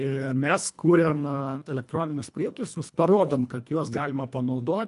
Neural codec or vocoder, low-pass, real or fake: codec, 24 kHz, 1.5 kbps, HILCodec; 10.8 kHz; fake